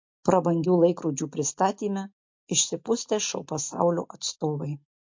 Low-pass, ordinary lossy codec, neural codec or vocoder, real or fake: 7.2 kHz; MP3, 48 kbps; none; real